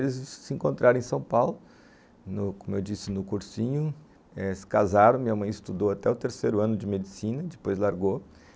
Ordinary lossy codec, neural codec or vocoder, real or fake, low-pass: none; none; real; none